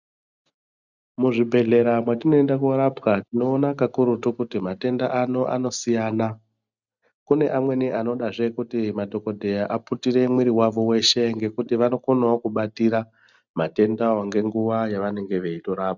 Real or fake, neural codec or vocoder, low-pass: real; none; 7.2 kHz